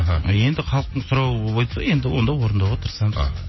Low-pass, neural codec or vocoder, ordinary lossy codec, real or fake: 7.2 kHz; none; MP3, 24 kbps; real